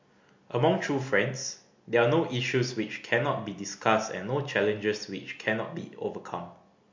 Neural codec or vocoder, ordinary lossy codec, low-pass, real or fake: none; MP3, 48 kbps; 7.2 kHz; real